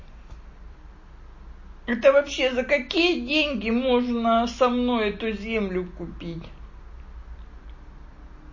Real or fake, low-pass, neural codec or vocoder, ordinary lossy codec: real; 7.2 kHz; none; MP3, 32 kbps